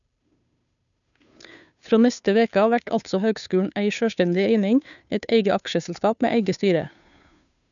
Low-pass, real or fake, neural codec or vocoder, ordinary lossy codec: 7.2 kHz; fake; codec, 16 kHz, 2 kbps, FunCodec, trained on Chinese and English, 25 frames a second; none